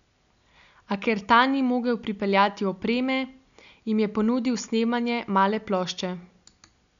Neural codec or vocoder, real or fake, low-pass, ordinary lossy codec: none; real; 7.2 kHz; none